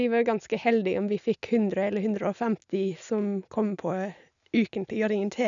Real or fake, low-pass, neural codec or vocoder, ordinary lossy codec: real; 7.2 kHz; none; none